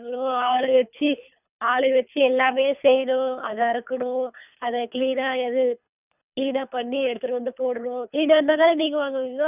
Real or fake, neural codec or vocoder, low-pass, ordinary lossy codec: fake; codec, 24 kHz, 3 kbps, HILCodec; 3.6 kHz; none